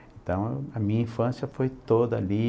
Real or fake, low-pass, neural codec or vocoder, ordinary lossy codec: real; none; none; none